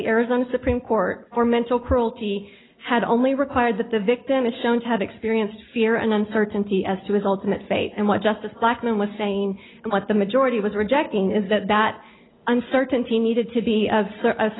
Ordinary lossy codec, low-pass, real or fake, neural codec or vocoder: AAC, 16 kbps; 7.2 kHz; real; none